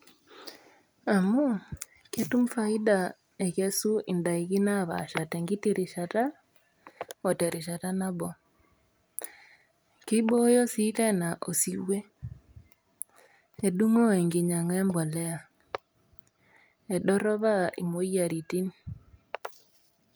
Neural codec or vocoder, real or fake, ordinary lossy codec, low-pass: none; real; none; none